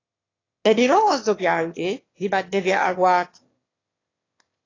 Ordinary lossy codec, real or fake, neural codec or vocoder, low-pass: AAC, 32 kbps; fake; autoencoder, 22.05 kHz, a latent of 192 numbers a frame, VITS, trained on one speaker; 7.2 kHz